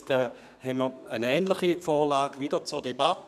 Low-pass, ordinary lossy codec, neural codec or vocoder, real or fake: 14.4 kHz; none; codec, 32 kHz, 1.9 kbps, SNAC; fake